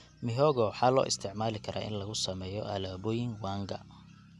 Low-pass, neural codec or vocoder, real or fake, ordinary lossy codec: none; none; real; none